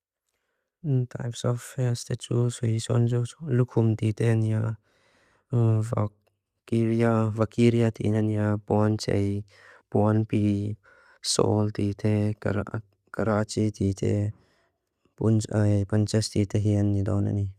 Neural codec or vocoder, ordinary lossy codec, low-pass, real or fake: none; Opus, 64 kbps; 14.4 kHz; real